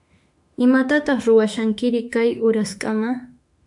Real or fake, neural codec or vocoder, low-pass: fake; autoencoder, 48 kHz, 32 numbers a frame, DAC-VAE, trained on Japanese speech; 10.8 kHz